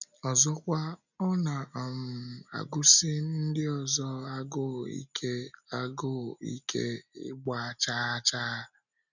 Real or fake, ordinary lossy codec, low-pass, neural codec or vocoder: real; none; 7.2 kHz; none